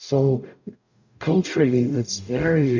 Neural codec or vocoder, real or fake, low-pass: codec, 44.1 kHz, 0.9 kbps, DAC; fake; 7.2 kHz